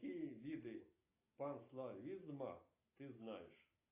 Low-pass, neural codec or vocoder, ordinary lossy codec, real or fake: 3.6 kHz; none; AAC, 24 kbps; real